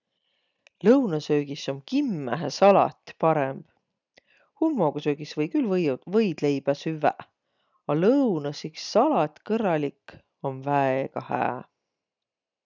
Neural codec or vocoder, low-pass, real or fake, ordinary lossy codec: none; 7.2 kHz; real; none